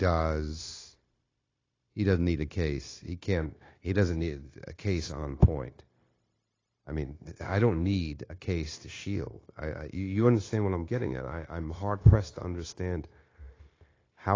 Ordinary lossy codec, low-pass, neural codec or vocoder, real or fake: AAC, 32 kbps; 7.2 kHz; codec, 16 kHz, 0.9 kbps, LongCat-Audio-Codec; fake